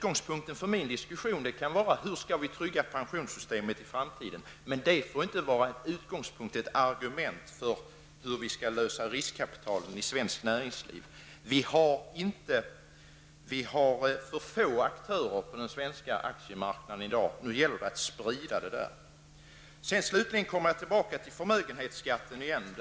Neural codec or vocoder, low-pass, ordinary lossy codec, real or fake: none; none; none; real